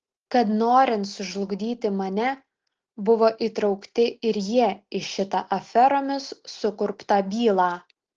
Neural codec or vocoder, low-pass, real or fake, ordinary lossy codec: none; 7.2 kHz; real; Opus, 16 kbps